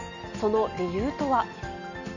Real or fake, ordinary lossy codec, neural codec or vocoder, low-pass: real; none; none; 7.2 kHz